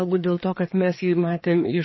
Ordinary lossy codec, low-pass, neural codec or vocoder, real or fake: MP3, 24 kbps; 7.2 kHz; codec, 16 kHz, 2 kbps, FreqCodec, larger model; fake